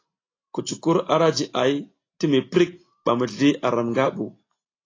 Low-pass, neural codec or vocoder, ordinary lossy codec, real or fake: 7.2 kHz; none; AAC, 32 kbps; real